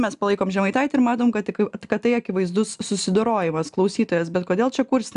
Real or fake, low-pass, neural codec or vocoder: real; 10.8 kHz; none